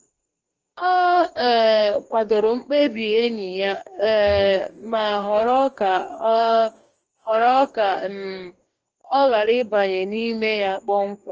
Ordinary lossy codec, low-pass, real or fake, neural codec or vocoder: Opus, 24 kbps; 7.2 kHz; fake; codec, 44.1 kHz, 2.6 kbps, DAC